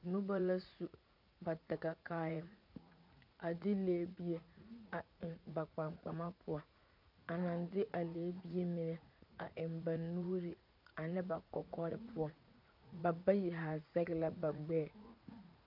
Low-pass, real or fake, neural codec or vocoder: 5.4 kHz; fake; vocoder, 44.1 kHz, 80 mel bands, Vocos